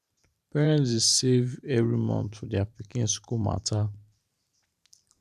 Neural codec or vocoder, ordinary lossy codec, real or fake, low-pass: vocoder, 44.1 kHz, 128 mel bands every 512 samples, BigVGAN v2; none; fake; 14.4 kHz